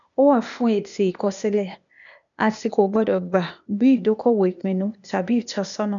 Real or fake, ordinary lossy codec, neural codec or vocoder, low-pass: fake; none; codec, 16 kHz, 0.8 kbps, ZipCodec; 7.2 kHz